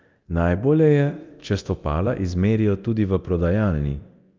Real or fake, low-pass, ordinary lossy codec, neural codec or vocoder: fake; 7.2 kHz; Opus, 24 kbps; codec, 24 kHz, 0.9 kbps, DualCodec